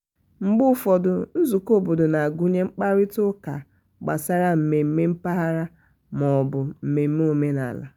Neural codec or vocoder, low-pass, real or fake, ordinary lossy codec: none; none; real; none